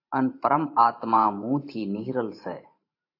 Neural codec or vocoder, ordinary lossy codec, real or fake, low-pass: vocoder, 44.1 kHz, 128 mel bands every 512 samples, BigVGAN v2; AAC, 32 kbps; fake; 5.4 kHz